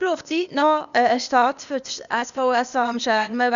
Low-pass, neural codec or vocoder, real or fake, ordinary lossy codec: 7.2 kHz; codec, 16 kHz, 0.8 kbps, ZipCodec; fake; none